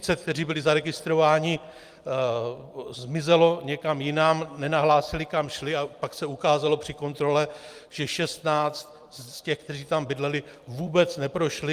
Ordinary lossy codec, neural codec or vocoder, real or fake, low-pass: Opus, 32 kbps; vocoder, 44.1 kHz, 128 mel bands every 256 samples, BigVGAN v2; fake; 14.4 kHz